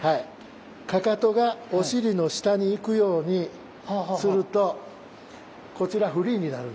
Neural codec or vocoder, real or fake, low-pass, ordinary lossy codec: none; real; none; none